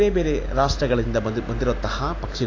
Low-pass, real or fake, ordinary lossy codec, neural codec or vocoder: 7.2 kHz; real; AAC, 32 kbps; none